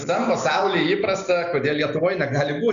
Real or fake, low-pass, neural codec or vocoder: real; 7.2 kHz; none